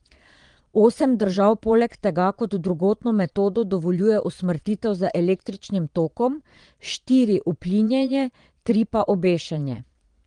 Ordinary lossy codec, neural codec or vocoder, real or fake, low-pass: Opus, 24 kbps; vocoder, 22.05 kHz, 80 mel bands, Vocos; fake; 9.9 kHz